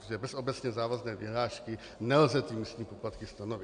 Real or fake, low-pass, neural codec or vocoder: real; 9.9 kHz; none